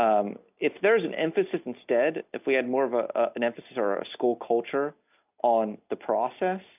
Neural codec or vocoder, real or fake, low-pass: none; real; 3.6 kHz